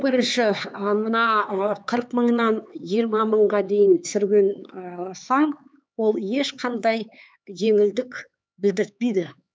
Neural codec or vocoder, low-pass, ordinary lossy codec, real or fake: codec, 16 kHz, 4 kbps, X-Codec, HuBERT features, trained on LibriSpeech; none; none; fake